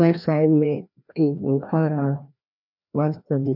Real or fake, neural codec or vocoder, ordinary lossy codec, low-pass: fake; codec, 16 kHz, 1 kbps, FreqCodec, larger model; none; 5.4 kHz